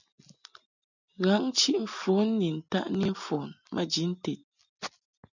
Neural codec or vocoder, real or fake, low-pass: none; real; 7.2 kHz